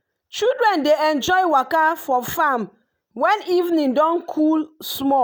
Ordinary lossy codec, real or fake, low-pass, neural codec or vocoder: none; real; none; none